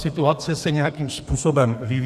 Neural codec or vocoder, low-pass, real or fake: codec, 32 kHz, 1.9 kbps, SNAC; 14.4 kHz; fake